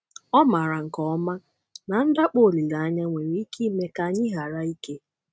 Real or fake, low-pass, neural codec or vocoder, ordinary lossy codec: real; none; none; none